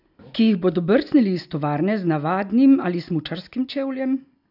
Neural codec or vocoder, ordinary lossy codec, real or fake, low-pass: none; none; real; 5.4 kHz